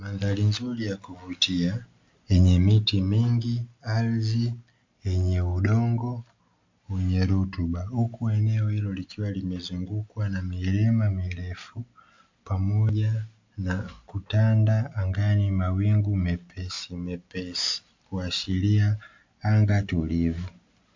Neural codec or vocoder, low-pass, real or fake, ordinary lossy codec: none; 7.2 kHz; real; MP3, 64 kbps